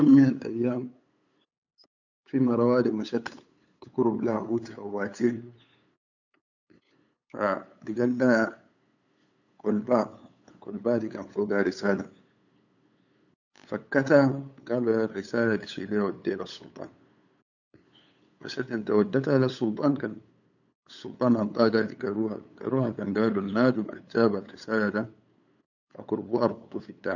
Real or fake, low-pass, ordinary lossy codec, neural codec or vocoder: fake; 7.2 kHz; none; codec, 16 kHz, 8 kbps, FunCodec, trained on LibriTTS, 25 frames a second